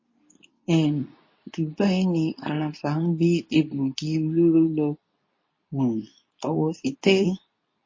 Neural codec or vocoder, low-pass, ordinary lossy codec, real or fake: codec, 24 kHz, 0.9 kbps, WavTokenizer, medium speech release version 2; 7.2 kHz; MP3, 32 kbps; fake